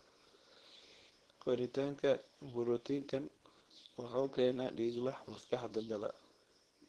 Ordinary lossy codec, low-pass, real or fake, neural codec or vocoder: Opus, 16 kbps; 10.8 kHz; fake; codec, 24 kHz, 0.9 kbps, WavTokenizer, small release